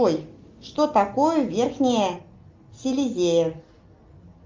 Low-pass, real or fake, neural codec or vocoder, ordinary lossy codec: 7.2 kHz; real; none; Opus, 24 kbps